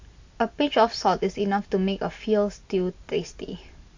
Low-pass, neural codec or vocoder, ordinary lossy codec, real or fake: 7.2 kHz; none; AAC, 48 kbps; real